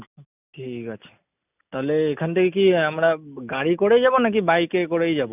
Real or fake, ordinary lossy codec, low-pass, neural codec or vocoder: real; none; 3.6 kHz; none